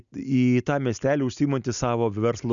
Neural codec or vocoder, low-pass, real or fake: none; 7.2 kHz; real